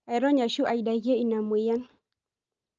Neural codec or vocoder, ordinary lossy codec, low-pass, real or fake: none; Opus, 24 kbps; 7.2 kHz; real